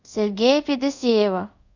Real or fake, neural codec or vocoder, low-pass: fake; codec, 24 kHz, 0.5 kbps, DualCodec; 7.2 kHz